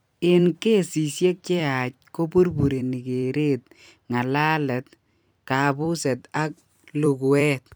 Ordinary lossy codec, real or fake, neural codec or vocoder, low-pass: none; fake; vocoder, 44.1 kHz, 128 mel bands every 256 samples, BigVGAN v2; none